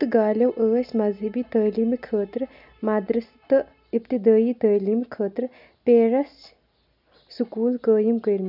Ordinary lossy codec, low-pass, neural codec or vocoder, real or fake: none; 5.4 kHz; none; real